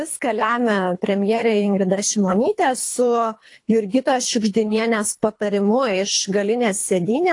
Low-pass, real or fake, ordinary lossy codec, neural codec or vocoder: 10.8 kHz; fake; AAC, 48 kbps; codec, 24 kHz, 3 kbps, HILCodec